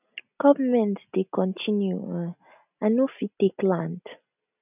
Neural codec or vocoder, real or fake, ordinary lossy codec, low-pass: none; real; none; 3.6 kHz